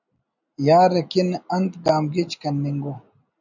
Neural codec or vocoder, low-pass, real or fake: none; 7.2 kHz; real